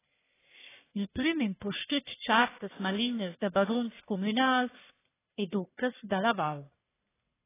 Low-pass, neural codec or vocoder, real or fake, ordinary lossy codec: 3.6 kHz; codec, 44.1 kHz, 1.7 kbps, Pupu-Codec; fake; AAC, 16 kbps